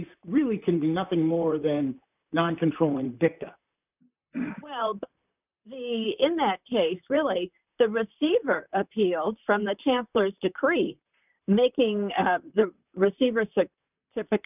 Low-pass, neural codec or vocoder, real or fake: 3.6 kHz; vocoder, 44.1 kHz, 128 mel bands, Pupu-Vocoder; fake